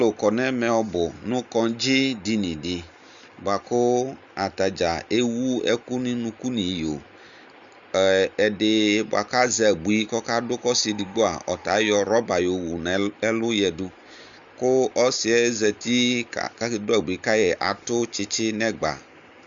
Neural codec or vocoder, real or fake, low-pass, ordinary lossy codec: none; real; 7.2 kHz; Opus, 64 kbps